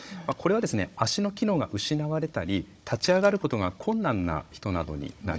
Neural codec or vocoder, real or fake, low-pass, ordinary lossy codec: codec, 16 kHz, 16 kbps, FunCodec, trained on Chinese and English, 50 frames a second; fake; none; none